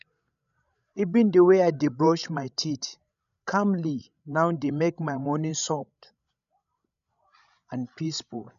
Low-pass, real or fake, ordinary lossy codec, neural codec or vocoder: 7.2 kHz; fake; none; codec, 16 kHz, 16 kbps, FreqCodec, larger model